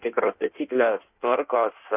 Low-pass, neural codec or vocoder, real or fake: 3.6 kHz; codec, 16 kHz in and 24 kHz out, 1.1 kbps, FireRedTTS-2 codec; fake